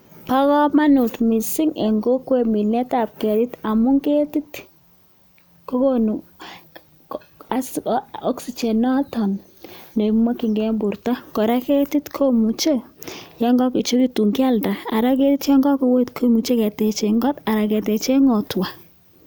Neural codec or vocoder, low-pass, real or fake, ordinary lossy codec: none; none; real; none